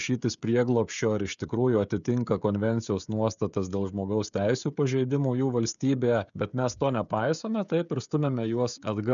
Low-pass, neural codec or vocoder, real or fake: 7.2 kHz; codec, 16 kHz, 16 kbps, FreqCodec, smaller model; fake